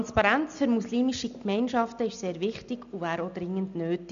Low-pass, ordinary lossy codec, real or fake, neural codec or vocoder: 7.2 kHz; AAC, 96 kbps; real; none